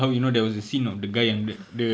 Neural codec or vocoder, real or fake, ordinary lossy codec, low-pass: none; real; none; none